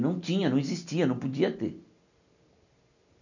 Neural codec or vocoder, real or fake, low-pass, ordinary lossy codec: none; real; 7.2 kHz; none